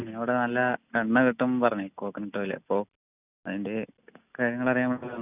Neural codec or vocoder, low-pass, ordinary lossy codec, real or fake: none; 3.6 kHz; none; real